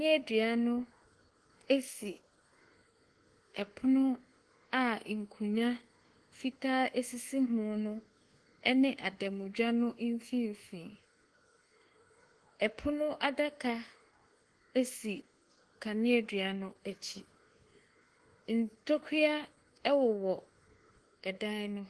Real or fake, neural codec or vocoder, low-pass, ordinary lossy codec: fake; codec, 24 kHz, 1.2 kbps, DualCodec; 10.8 kHz; Opus, 16 kbps